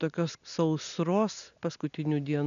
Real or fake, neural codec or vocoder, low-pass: real; none; 7.2 kHz